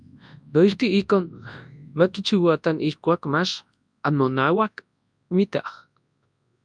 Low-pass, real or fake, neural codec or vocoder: 9.9 kHz; fake; codec, 24 kHz, 0.9 kbps, WavTokenizer, large speech release